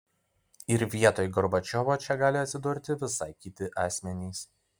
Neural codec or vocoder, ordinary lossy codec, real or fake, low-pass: none; MP3, 96 kbps; real; 19.8 kHz